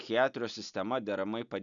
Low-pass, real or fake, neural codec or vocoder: 7.2 kHz; real; none